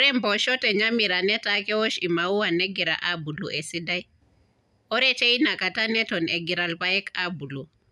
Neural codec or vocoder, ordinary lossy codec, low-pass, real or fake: none; none; none; real